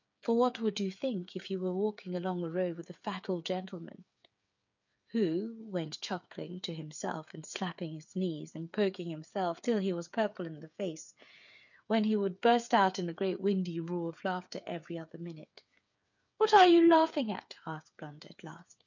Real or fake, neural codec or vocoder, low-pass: fake; codec, 16 kHz, 8 kbps, FreqCodec, smaller model; 7.2 kHz